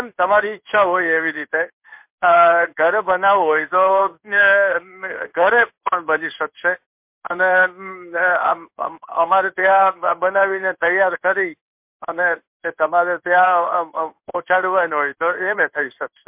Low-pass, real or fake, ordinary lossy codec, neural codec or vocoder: 3.6 kHz; fake; MP3, 32 kbps; codec, 16 kHz in and 24 kHz out, 1 kbps, XY-Tokenizer